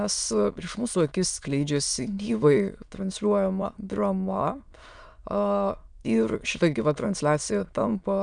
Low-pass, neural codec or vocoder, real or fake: 9.9 kHz; autoencoder, 22.05 kHz, a latent of 192 numbers a frame, VITS, trained on many speakers; fake